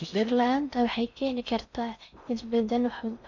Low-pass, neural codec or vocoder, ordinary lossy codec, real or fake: 7.2 kHz; codec, 16 kHz in and 24 kHz out, 0.6 kbps, FocalCodec, streaming, 4096 codes; none; fake